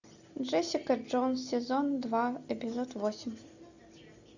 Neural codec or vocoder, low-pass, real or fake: none; 7.2 kHz; real